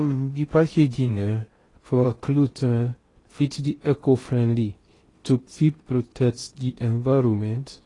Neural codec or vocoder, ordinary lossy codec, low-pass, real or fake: codec, 16 kHz in and 24 kHz out, 0.6 kbps, FocalCodec, streaming, 4096 codes; AAC, 32 kbps; 10.8 kHz; fake